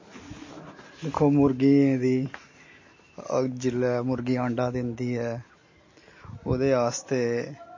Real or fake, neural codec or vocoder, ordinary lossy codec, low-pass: real; none; MP3, 32 kbps; 7.2 kHz